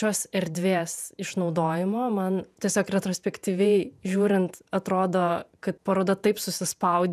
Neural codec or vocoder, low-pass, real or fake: vocoder, 48 kHz, 128 mel bands, Vocos; 14.4 kHz; fake